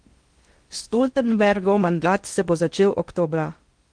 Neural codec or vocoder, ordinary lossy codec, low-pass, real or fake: codec, 16 kHz in and 24 kHz out, 0.6 kbps, FocalCodec, streaming, 4096 codes; Opus, 24 kbps; 9.9 kHz; fake